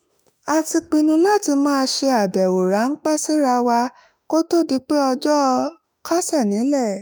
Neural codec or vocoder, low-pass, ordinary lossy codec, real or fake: autoencoder, 48 kHz, 32 numbers a frame, DAC-VAE, trained on Japanese speech; none; none; fake